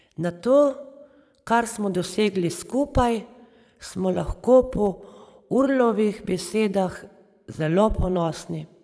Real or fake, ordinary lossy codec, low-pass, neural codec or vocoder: fake; none; none; vocoder, 22.05 kHz, 80 mel bands, Vocos